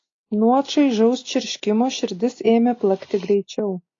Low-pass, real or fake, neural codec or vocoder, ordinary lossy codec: 7.2 kHz; real; none; AAC, 32 kbps